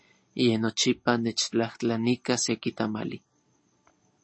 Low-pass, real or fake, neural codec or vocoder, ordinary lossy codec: 9.9 kHz; real; none; MP3, 32 kbps